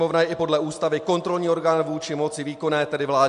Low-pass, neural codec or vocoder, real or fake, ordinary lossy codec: 10.8 kHz; none; real; MP3, 64 kbps